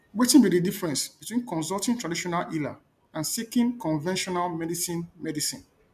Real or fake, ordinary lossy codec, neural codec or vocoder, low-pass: real; MP3, 96 kbps; none; 14.4 kHz